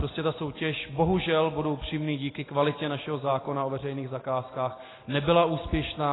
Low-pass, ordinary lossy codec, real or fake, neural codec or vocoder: 7.2 kHz; AAC, 16 kbps; real; none